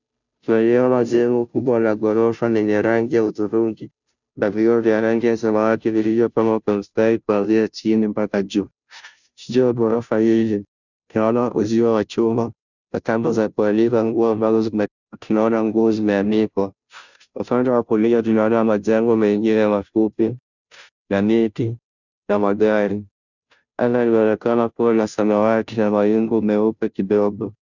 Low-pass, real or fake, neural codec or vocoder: 7.2 kHz; fake; codec, 16 kHz, 0.5 kbps, FunCodec, trained on Chinese and English, 25 frames a second